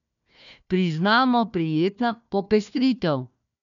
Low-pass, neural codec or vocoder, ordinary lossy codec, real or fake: 7.2 kHz; codec, 16 kHz, 1 kbps, FunCodec, trained on Chinese and English, 50 frames a second; none; fake